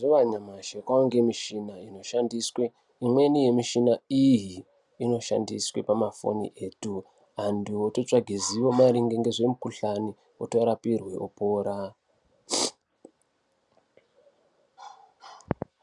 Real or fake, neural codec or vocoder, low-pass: real; none; 10.8 kHz